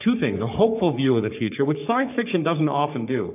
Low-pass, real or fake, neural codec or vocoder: 3.6 kHz; fake; codec, 44.1 kHz, 3.4 kbps, Pupu-Codec